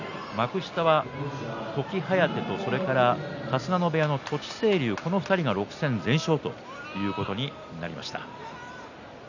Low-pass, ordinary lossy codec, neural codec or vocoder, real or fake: 7.2 kHz; none; none; real